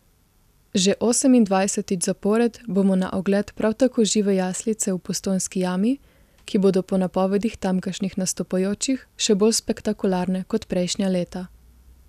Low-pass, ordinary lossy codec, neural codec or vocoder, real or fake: 14.4 kHz; none; none; real